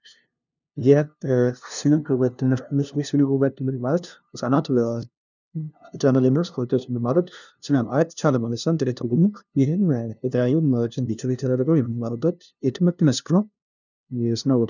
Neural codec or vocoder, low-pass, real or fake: codec, 16 kHz, 0.5 kbps, FunCodec, trained on LibriTTS, 25 frames a second; 7.2 kHz; fake